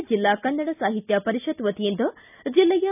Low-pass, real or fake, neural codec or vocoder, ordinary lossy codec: 3.6 kHz; real; none; none